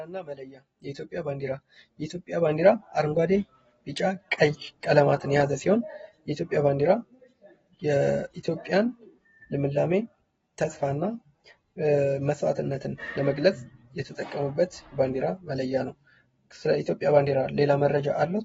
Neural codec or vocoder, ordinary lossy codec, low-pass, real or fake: none; AAC, 24 kbps; 19.8 kHz; real